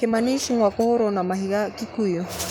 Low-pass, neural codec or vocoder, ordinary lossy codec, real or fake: none; codec, 44.1 kHz, 7.8 kbps, Pupu-Codec; none; fake